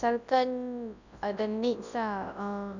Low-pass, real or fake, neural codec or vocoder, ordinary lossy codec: 7.2 kHz; fake; codec, 24 kHz, 0.9 kbps, WavTokenizer, large speech release; none